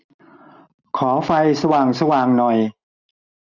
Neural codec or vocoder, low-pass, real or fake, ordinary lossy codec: none; 7.2 kHz; real; none